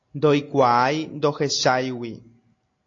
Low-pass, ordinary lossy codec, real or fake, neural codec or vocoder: 7.2 kHz; AAC, 48 kbps; real; none